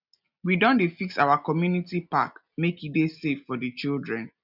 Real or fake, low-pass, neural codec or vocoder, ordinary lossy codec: real; 5.4 kHz; none; none